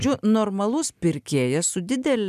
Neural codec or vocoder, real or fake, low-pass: none; real; 14.4 kHz